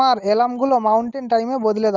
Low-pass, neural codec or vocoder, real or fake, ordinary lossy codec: 7.2 kHz; none; real; Opus, 32 kbps